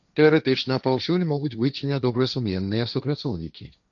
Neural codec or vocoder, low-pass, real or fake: codec, 16 kHz, 1.1 kbps, Voila-Tokenizer; 7.2 kHz; fake